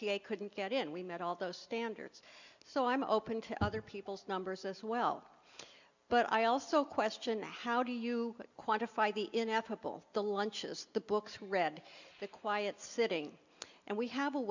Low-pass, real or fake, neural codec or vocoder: 7.2 kHz; real; none